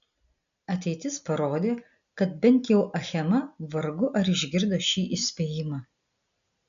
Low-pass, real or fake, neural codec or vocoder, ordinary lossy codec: 7.2 kHz; real; none; MP3, 96 kbps